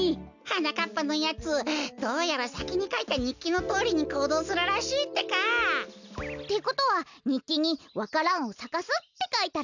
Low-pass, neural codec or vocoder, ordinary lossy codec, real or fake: 7.2 kHz; none; none; real